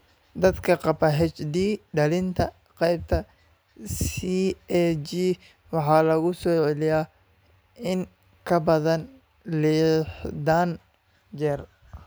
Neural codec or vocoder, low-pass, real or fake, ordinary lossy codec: none; none; real; none